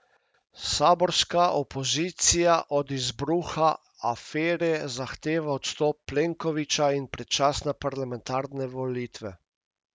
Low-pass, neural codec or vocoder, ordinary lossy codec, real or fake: none; none; none; real